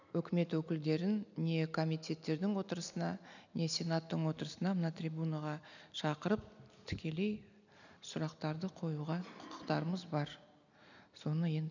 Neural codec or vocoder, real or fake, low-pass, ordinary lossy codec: none; real; 7.2 kHz; none